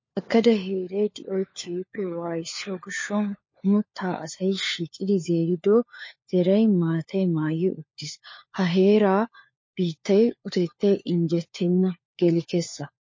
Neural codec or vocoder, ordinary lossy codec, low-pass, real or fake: codec, 16 kHz, 4 kbps, FunCodec, trained on LibriTTS, 50 frames a second; MP3, 32 kbps; 7.2 kHz; fake